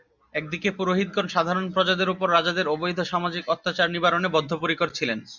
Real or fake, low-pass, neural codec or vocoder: real; 7.2 kHz; none